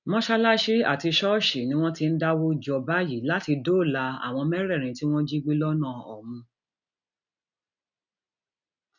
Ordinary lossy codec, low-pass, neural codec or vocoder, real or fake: none; 7.2 kHz; none; real